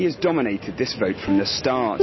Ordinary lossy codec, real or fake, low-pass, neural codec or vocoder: MP3, 24 kbps; real; 7.2 kHz; none